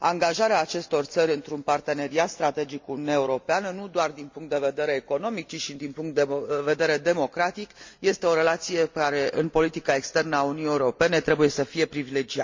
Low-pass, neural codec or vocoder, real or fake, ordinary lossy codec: 7.2 kHz; none; real; MP3, 64 kbps